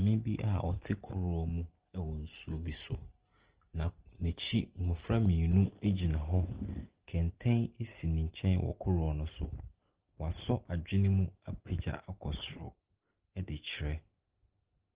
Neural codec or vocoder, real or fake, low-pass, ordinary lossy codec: none; real; 3.6 kHz; Opus, 16 kbps